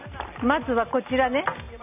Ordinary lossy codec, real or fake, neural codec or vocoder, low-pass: none; real; none; 3.6 kHz